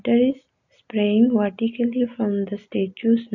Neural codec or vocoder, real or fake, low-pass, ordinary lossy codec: none; real; 7.2 kHz; MP3, 48 kbps